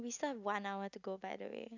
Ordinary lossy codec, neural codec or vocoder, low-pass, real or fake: none; none; 7.2 kHz; real